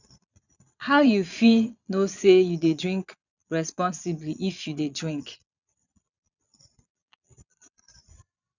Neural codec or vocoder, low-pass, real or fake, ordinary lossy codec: vocoder, 22.05 kHz, 80 mel bands, Vocos; 7.2 kHz; fake; none